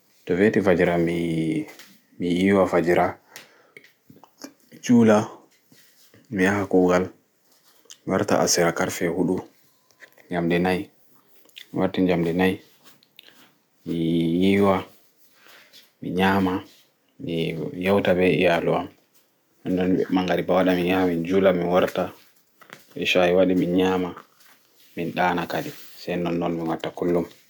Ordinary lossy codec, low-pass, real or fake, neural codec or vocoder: none; none; real; none